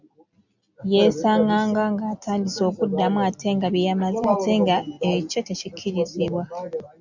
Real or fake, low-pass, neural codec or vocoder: real; 7.2 kHz; none